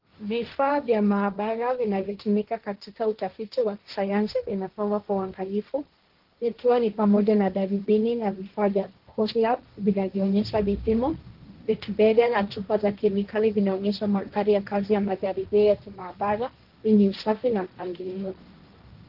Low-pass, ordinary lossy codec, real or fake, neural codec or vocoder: 5.4 kHz; Opus, 16 kbps; fake; codec, 16 kHz, 1.1 kbps, Voila-Tokenizer